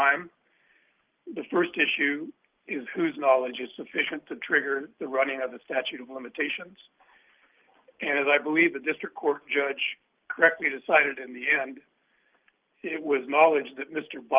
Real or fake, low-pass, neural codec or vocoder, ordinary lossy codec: real; 3.6 kHz; none; Opus, 32 kbps